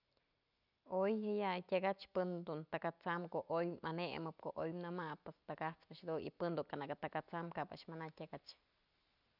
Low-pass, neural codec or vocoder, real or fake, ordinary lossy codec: 5.4 kHz; none; real; none